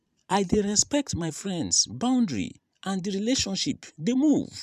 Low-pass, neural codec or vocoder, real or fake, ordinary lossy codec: 14.4 kHz; vocoder, 44.1 kHz, 128 mel bands every 512 samples, BigVGAN v2; fake; none